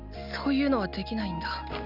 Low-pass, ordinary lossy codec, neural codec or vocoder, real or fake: 5.4 kHz; none; none; real